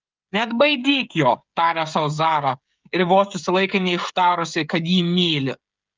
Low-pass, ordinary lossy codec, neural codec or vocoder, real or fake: 7.2 kHz; Opus, 24 kbps; codec, 16 kHz, 16 kbps, FreqCodec, smaller model; fake